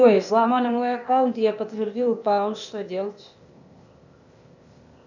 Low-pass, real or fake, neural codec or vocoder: 7.2 kHz; fake; codec, 16 kHz, 0.8 kbps, ZipCodec